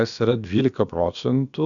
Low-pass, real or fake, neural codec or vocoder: 7.2 kHz; fake; codec, 16 kHz, about 1 kbps, DyCAST, with the encoder's durations